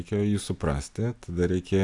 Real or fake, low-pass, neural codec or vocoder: real; 10.8 kHz; none